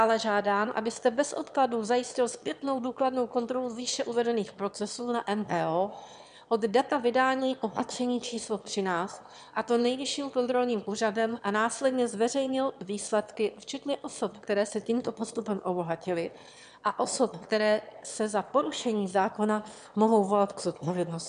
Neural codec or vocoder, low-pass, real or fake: autoencoder, 22.05 kHz, a latent of 192 numbers a frame, VITS, trained on one speaker; 9.9 kHz; fake